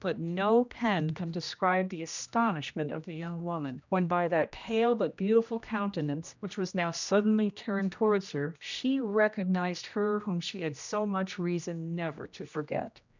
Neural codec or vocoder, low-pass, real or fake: codec, 16 kHz, 1 kbps, X-Codec, HuBERT features, trained on general audio; 7.2 kHz; fake